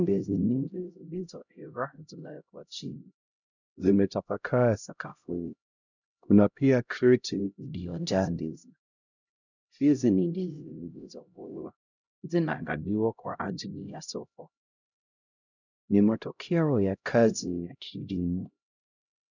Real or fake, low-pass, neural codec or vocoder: fake; 7.2 kHz; codec, 16 kHz, 0.5 kbps, X-Codec, HuBERT features, trained on LibriSpeech